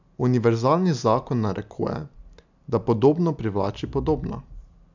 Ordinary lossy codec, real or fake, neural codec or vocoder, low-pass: none; real; none; 7.2 kHz